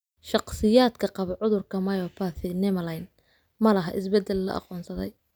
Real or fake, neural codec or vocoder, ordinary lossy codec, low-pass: real; none; none; none